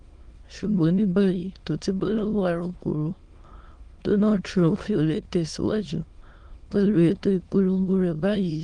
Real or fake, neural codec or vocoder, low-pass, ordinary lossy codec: fake; autoencoder, 22.05 kHz, a latent of 192 numbers a frame, VITS, trained on many speakers; 9.9 kHz; Opus, 24 kbps